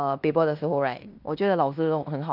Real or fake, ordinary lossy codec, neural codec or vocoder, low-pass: fake; none; codec, 16 kHz in and 24 kHz out, 0.9 kbps, LongCat-Audio-Codec, fine tuned four codebook decoder; 5.4 kHz